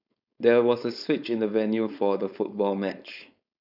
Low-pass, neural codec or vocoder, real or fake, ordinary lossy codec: 5.4 kHz; codec, 16 kHz, 4.8 kbps, FACodec; fake; none